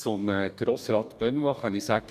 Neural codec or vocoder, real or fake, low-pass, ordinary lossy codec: codec, 44.1 kHz, 2.6 kbps, DAC; fake; 14.4 kHz; none